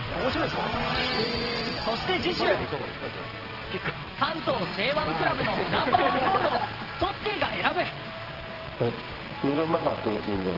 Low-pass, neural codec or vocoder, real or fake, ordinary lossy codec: 5.4 kHz; vocoder, 22.05 kHz, 80 mel bands, WaveNeXt; fake; Opus, 16 kbps